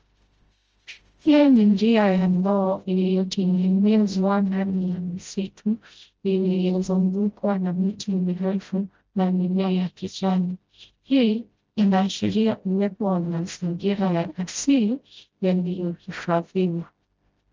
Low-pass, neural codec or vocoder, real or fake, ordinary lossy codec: 7.2 kHz; codec, 16 kHz, 0.5 kbps, FreqCodec, smaller model; fake; Opus, 24 kbps